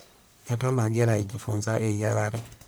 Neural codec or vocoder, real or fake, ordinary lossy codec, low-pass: codec, 44.1 kHz, 1.7 kbps, Pupu-Codec; fake; none; none